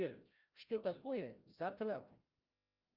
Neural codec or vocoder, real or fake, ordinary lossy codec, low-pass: codec, 16 kHz, 0.5 kbps, FreqCodec, larger model; fake; Opus, 24 kbps; 5.4 kHz